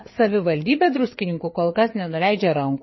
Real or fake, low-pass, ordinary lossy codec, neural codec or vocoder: fake; 7.2 kHz; MP3, 24 kbps; codec, 16 kHz, 4 kbps, FreqCodec, larger model